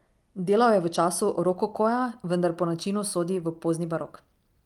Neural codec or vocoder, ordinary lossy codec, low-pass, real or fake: none; Opus, 32 kbps; 19.8 kHz; real